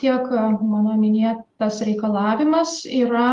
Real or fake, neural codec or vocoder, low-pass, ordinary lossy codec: real; none; 10.8 kHz; Opus, 24 kbps